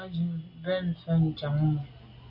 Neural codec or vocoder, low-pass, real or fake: none; 5.4 kHz; real